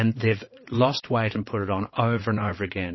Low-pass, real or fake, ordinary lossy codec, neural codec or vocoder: 7.2 kHz; fake; MP3, 24 kbps; vocoder, 22.05 kHz, 80 mel bands, WaveNeXt